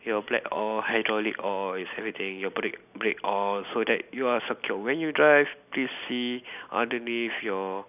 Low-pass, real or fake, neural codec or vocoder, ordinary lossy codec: 3.6 kHz; real; none; none